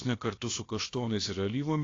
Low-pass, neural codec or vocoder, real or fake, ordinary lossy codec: 7.2 kHz; codec, 16 kHz, about 1 kbps, DyCAST, with the encoder's durations; fake; AAC, 32 kbps